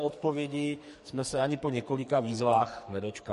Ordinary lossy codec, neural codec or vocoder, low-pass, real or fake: MP3, 48 kbps; codec, 44.1 kHz, 2.6 kbps, SNAC; 14.4 kHz; fake